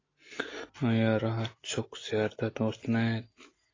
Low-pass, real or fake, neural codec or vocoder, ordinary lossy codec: 7.2 kHz; real; none; AAC, 32 kbps